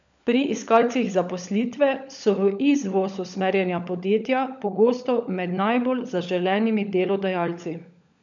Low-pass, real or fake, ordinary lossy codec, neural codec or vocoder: 7.2 kHz; fake; none; codec, 16 kHz, 16 kbps, FunCodec, trained on LibriTTS, 50 frames a second